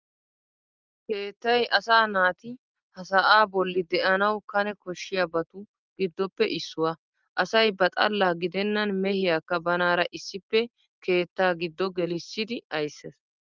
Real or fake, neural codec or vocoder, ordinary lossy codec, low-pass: real; none; Opus, 24 kbps; 7.2 kHz